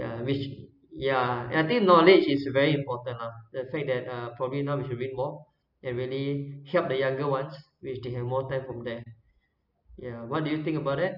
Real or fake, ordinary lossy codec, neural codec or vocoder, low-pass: real; none; none; 5.4 kHz